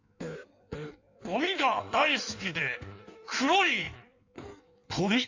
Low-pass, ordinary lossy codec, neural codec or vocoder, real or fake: 7.2 kHz; AAC, 48 kbps; codec, 16 kHz in and 24 kHz out, 1.1 kbps, FireRedTTS-2 codec; fake